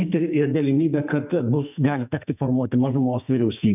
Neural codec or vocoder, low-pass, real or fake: codec, 44.1 kHz, 2.6 kbps, SNAC; 3.6 kHz; fake